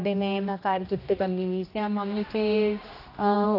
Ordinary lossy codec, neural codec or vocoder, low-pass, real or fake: AAC, 32 kbps; codec, 16 kHz, 1 kbps, X-Codec, HuBERT features, trained on general audio; 5.4 kHz; fake